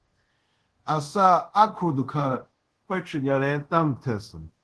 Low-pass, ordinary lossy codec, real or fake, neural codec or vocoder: 10.8 kHz; Opus, 16 kbps; fake; codec, 24 kHz, 0.5 kbps, DualCodec